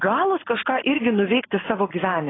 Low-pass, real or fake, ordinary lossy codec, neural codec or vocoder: 7.2 kHz; real; AAC, 16 kbps; none